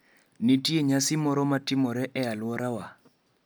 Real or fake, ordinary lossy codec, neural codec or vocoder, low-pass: real; none; none; none